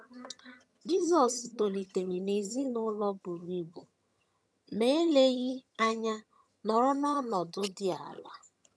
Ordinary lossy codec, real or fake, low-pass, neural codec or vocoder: none; fake; none; vocoder, 22.05 kHz, 80 mel bands, HiFi-GAN